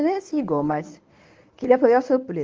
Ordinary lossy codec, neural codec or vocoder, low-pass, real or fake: Opus, 24 kbps; codec, 24 kHz, 0.9 kbps, WavTokenizer, medium speech release version 1; 7.2 kHz; fake